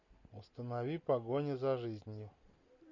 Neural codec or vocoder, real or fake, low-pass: none; real; 7.2 kHz